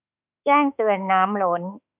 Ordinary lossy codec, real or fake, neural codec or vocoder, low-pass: none; fake; autoencoder, 48 kHz, 32 numbers a frame, DAC-VAE, trained on Japanese speech; 3.6 kHz